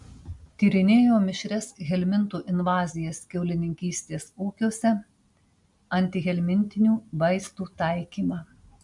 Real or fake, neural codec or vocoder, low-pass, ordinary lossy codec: real; none; 10.8 kHz; MP3, 64 kbps